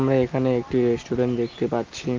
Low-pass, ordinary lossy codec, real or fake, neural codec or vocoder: 7.2 kHz; Opus, 32 kbps; real; none